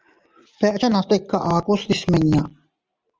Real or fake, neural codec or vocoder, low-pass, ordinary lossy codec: real; none; 7.2 kHz; Opus, 24 kbps